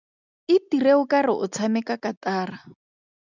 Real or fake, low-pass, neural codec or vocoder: real; 7.2 kHz; none